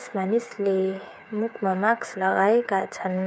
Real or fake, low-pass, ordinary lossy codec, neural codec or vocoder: fake; none; none; codec, 16 kHz, 8 kbps, FreqCodec, smaller model